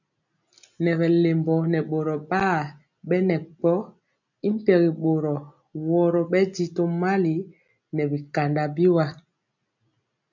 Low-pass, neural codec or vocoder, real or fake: 7.2 kHz; none; real